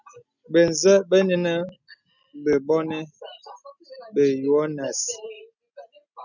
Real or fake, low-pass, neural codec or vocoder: real; 7.2 kHz; none